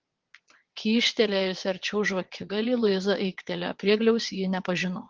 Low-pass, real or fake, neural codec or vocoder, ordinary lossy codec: 7.2 kHz; fake; vocoder, 22.05 kHz, 80 mel bands, WaveNeXt; Opus, 16 kbps